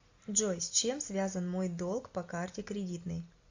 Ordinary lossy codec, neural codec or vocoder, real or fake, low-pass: AAC, 48 kbps; none; real; 7.2 kHz